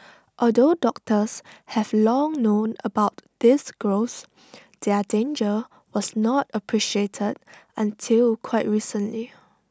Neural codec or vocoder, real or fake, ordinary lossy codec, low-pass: none; real; none; none